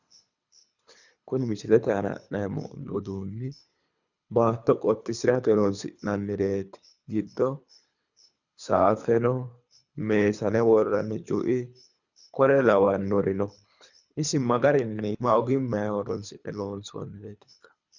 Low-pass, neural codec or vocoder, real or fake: 7.2 kHz; codec, 24 kHz, 3 kbps, HILCodec; fake